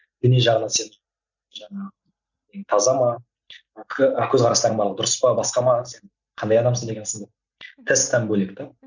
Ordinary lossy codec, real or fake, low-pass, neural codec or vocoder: none; real; 7.2 kHz; none